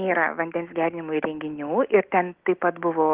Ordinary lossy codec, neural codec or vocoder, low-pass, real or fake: Opus, 16 kbps; none; 3.6 kHz; real